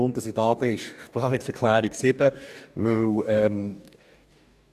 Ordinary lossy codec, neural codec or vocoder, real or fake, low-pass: none; codec, 44.1 kHz, 2.6 kbps, DAC; fake; 14.4 kHz